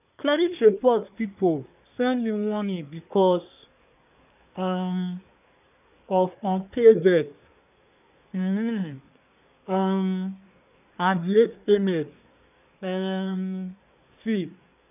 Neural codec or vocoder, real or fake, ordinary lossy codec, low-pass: codec, 24 kHz, 1 kbps, SNAC; fake; none; 3.6 kHz